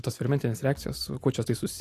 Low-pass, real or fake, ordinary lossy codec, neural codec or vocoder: 14.4 kHz; real; AAC, 64 kbps; none